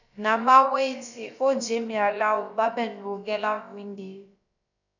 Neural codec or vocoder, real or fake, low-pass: codec, 16 kHz, about 1 kbps, DyCAST, with the encoder's durations; fake; 7.2 kHz